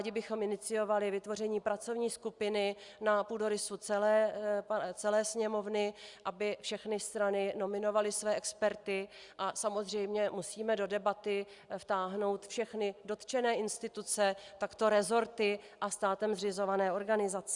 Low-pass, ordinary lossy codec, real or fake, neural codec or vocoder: 10.8 kHz; Opus, 64 kbps; real; none